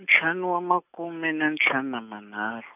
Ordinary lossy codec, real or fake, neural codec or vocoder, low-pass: none; real; none; 3.6 kHz